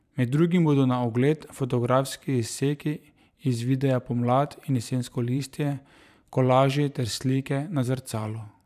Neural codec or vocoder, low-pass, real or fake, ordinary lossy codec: vocoder, 44.1 kHz, 128 mel bands every 512 samples, BigVGAN v2; 14.4 kHz; fake; none